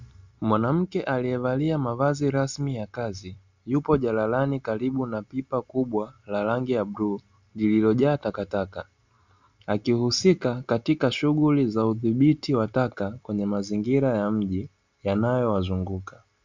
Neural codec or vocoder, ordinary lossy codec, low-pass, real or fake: none; Opus, 64 kbps; 7.2 kHz; real